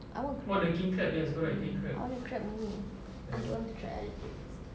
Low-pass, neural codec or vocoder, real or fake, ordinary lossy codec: none; none; real; none